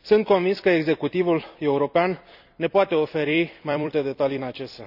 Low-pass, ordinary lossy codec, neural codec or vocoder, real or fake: 5.4 kHz; none; vocoder, 44.1 kHz, 128 mel bands every 256 samples, BigVGAN v2; fake